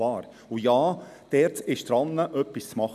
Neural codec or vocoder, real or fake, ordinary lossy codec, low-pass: none; real; none; 14.4 kHz